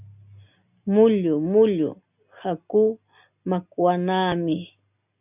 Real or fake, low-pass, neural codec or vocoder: real; 3.6 kHz; none